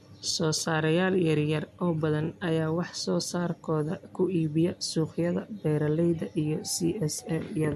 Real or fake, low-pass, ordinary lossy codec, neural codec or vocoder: real; 14.4 kHz; MP3, 64 kbps; none